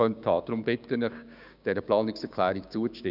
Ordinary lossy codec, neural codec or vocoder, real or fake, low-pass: none; codec, 16 kHz, 6 kbps, DAC; fake; 5.4 kHz